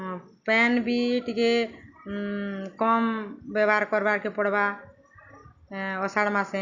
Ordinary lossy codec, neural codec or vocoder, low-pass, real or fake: Opus, 64 kbps; none; 7.2 kHz; real